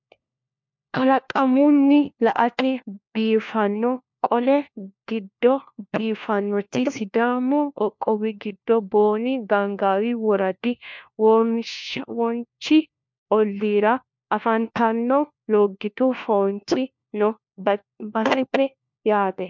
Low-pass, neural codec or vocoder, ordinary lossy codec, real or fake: 7.2 kHz; codec, 16 kHz, 1 kbps, FunCodec, trained on LibriTTS, 50 frames a second; MP3, 64 kbps; fake